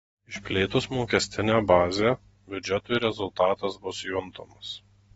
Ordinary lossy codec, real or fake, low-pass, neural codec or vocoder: AAC, 24 kbps; real; 19.8 kHz; none